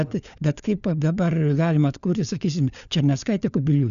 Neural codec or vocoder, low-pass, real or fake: codec, 16 kHz, 4 kbps, FunCodec, trained on LibriTTS, 50 frames a second; 7.2 kHz; fake